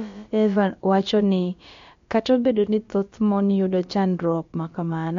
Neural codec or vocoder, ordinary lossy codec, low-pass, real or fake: codec, 16 kHz, about 1 kbps, DyCAST, with the encoder's durations; MP3, 48 kbps; 7.2 kHz; fake